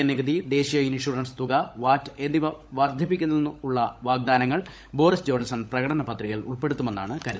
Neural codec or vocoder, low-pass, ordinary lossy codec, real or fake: codec, 16 kHz, 16 kbps, FunCodec, trained on LibriTTS, 50 frames a second; none; none; fake